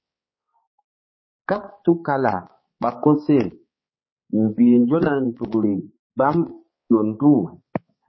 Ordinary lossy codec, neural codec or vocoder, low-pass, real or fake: MP3, 24 kbps; codec, 16 kHz, 4 kbps, X-Codec, HuBERT features, trained on balanced general audio; 7.2 kHz; fake